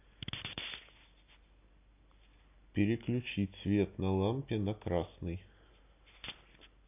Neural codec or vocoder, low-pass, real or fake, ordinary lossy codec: none; 3.6 kHz; real; AAC, 32 kbps